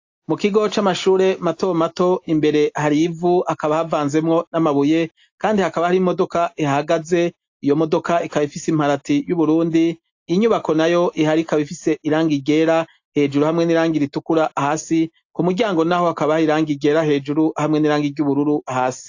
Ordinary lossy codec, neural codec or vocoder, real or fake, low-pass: AAC, 48 kbps; none; real; 7.2 kHz